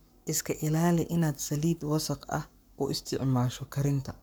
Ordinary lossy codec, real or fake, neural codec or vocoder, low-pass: none; fake; codec, 44.1 kHz, 7.8 kbps, Pupu-Codec; none